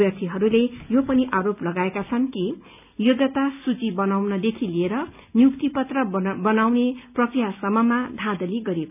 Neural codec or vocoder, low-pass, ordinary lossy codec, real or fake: none; 3.6 kHz; none; real